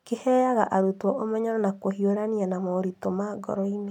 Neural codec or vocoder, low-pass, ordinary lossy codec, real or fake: none; 19.8 kHz; none; real